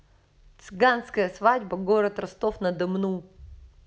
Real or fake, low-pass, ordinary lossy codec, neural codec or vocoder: real; none; none; none